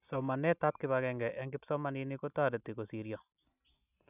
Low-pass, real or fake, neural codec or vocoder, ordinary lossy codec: 3.6 kHz; real; none; none